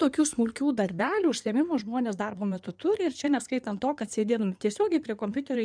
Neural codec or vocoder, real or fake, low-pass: codec, 16 kHz in and 24 kHz out, 2.2 kbps, FireRedTTS-2 codec; fake; 9.9 kHz